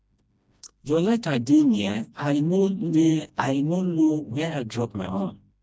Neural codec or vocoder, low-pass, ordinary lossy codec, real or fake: codec, 16 kHz, 1 kbps, FreqCodec, smaller model; none; none; fake